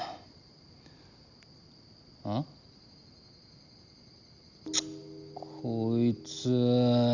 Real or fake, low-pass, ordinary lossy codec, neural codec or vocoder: real; 7.2 kHz; Opus, 64 kbps; none